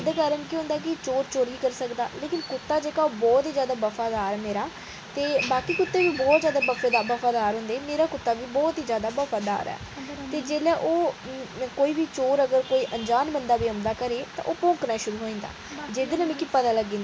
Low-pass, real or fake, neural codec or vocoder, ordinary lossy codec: none; real; none; none